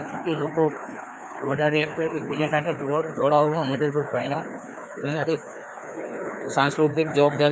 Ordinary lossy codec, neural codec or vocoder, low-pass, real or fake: none; codec, 16 kHz, 2 kbps, FreqCodec, larger model; none; fake